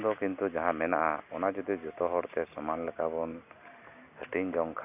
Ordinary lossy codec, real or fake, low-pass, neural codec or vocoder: none; real; 3.6 kHz; none